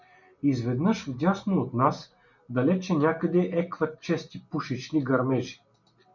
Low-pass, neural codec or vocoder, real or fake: 7.2 kHz; none; real